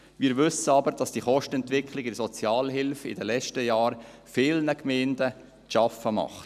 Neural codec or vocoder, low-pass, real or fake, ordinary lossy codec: none; 14.4 kHz; real; none